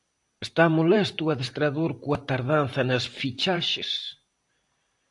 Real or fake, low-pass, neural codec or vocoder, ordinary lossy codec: fake; 10.8 kHz; vocoder, 44.1 kHz, 128 mel bands every 512 samples, BigVGAN v2; AAC, 64 kbps